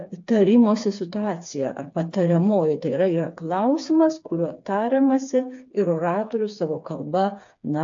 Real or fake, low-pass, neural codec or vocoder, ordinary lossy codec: fake; 7.2 kHz; codec, 16 kHz, 4 kbps, FreqCodec, smaller model; AAC, 64 kbps